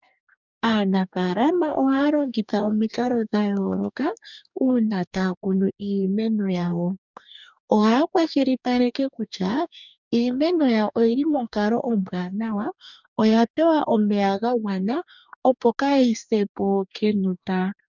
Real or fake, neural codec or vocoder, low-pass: fake; codec, 44.1 kHz, 2.6 kbps, DAC; 7.2 kHz